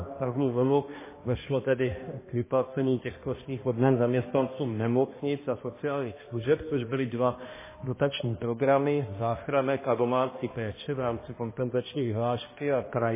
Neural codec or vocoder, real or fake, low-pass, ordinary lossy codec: codec, 16 kHz, 1 kbps, X-Codec, HuBERT features, trained on balanced general audio; fake; 3.6 kHz; MP3, 16 kbps